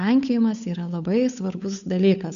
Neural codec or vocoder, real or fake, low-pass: codec, 16 kHz, 8 kbps, FunCodec, trained on Chinese and English, 25 frames a second; fake; 7.2 kHz